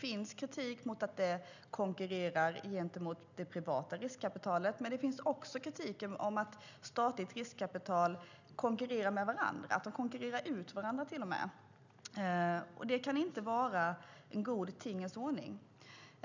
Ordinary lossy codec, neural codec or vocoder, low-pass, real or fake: none; none; 7.2 kHz; real